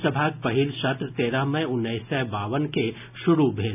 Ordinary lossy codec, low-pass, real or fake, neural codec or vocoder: none; 3.6 kHz; real; none